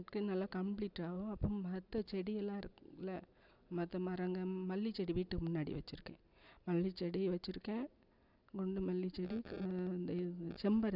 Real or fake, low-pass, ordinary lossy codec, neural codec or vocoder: real; 5.4 kHz; none; none